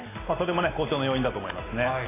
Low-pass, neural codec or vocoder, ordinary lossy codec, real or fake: 3.6 kHz; none; AAC, 16 kbps; real